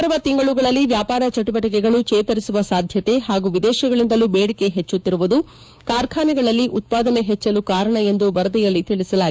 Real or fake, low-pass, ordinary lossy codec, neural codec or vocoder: fake; none; none; codec, 16 kHz, 6 kbps, DAC